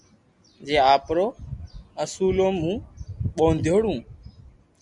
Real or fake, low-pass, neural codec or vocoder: real; 10.8 kHz; none